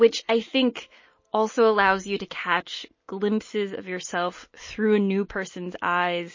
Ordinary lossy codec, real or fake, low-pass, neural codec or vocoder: MP3, 32 kbps; real; 7.2 kHz; none